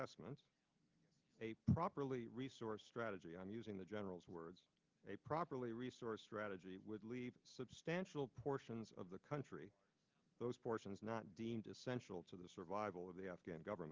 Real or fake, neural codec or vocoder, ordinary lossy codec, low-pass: real; none; Opus, 32 kbps; 7.2 kHz